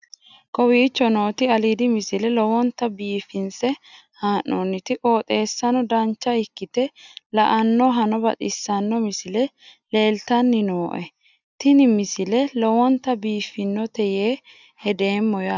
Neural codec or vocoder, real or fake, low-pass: none; real; 7.2 kHz